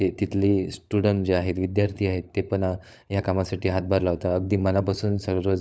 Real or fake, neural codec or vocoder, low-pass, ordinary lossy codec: fake; codec, 16 kHz, 16 kbps, FunCodec, trained on LibriTTS, 50 frames a second; none; none